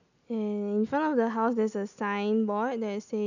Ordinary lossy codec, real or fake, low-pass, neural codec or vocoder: none; real; 7.2 kHz; none